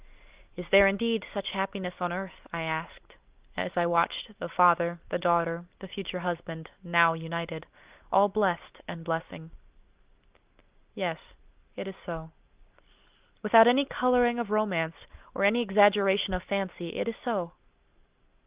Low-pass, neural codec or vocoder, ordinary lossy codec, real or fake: 3.6 kHz; none; Opus, 32 kbps; real